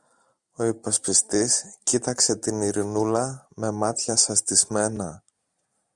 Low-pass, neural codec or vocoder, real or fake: 10.8 kHz; none; real